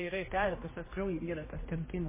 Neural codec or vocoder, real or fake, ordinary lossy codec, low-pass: codec, 16 kHz, 1 kbps, X-Codec, HuBERT features, trained on general audio; fake; MP3, 16 kbps; 3.6 kHz